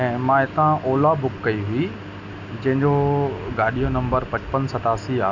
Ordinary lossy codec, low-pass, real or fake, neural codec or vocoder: none; 7.2 kHz; real; none